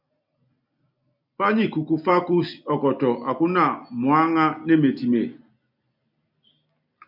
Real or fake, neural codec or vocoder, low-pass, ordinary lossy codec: real; none; 5.4 kHz; MP3, 48 kbps